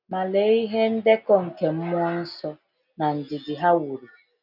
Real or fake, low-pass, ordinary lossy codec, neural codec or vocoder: real; 5.4 kHz; none; none